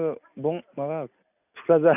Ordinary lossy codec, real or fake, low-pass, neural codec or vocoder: none; real; 3.6 kHz; none